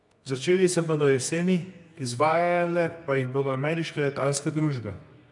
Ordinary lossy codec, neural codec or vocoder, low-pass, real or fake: none; codec, 24 kHz, 0.9 kbps, WavTokenizer, medium music audio release; 10.8 kHz; fake